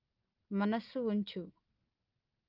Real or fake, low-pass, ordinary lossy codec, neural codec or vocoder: real; 5.4 kHz; Opus, 32 kbps; none